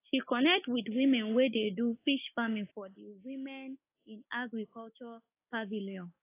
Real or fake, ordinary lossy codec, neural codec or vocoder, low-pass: fake; AAC, 24 kbps; codec, 16 kHz, 6 kbps, DAC; 3.6 kHz